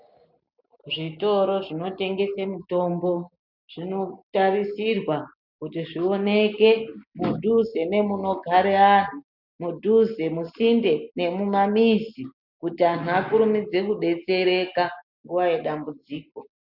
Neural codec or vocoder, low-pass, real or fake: none; 5.4 kHz; real